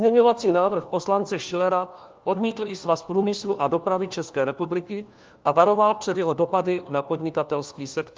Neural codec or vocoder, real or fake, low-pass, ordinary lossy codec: codec, 16 kHz, 1 kbps, FunCodec, trained on LibriTTS, 50 frames a second; fake; 7.2 kHz; Opus, 24 kbps